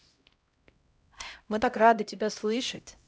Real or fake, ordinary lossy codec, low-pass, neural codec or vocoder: fake; none; none; codec, 16 kHz, 0.5 kbps, X-Codec, HuBERT features, trained on LibriSpeech